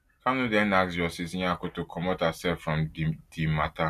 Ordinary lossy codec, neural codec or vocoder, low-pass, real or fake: none; none; 14.4 kHz; real